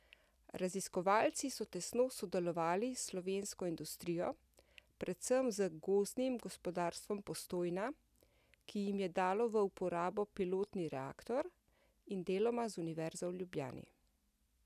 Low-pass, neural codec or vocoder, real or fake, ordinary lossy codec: 14.4 kHz; none; real; none